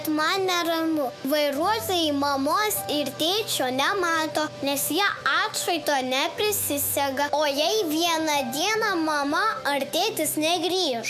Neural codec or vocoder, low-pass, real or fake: autoencoder, 48 kHz, 128 numbers a frame, DAC-VAE, trained on Japanese speech; 14.4 kHz; fake